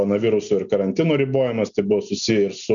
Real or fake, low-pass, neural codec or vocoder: real; 7.2 kHz; none